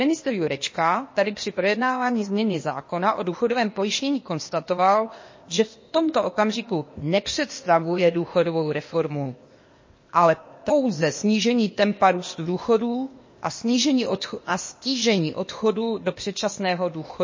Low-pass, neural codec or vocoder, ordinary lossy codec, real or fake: 7.2 kHz; codec, 16 kHz, 0.8 kbps, ZipCodec; MP3, 32 kbps; fake